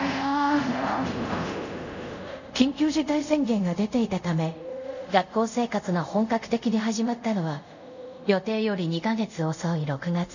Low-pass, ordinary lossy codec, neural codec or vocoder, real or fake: 7.2 kHz; none; codec, 24 kHz, 0.5 kbps, DualCodec; fake